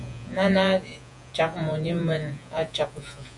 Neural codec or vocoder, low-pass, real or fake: vocoder, 48 kHz, 128 mel bands, Vocos; 10.8 kHz; fake